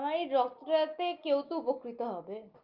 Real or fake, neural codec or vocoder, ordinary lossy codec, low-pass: real; none; Opus, 24 kbps; 5.4 kHz